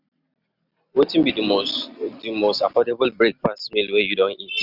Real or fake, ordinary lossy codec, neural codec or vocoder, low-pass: real; none; none; 5.4 kHz